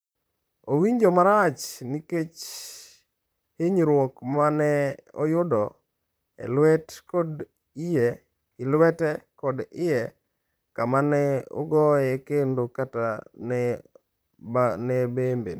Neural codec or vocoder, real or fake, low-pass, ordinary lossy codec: vocoder, 44.1 kHz, 128 mel bands, Pupu-Vocoder; fake; none; none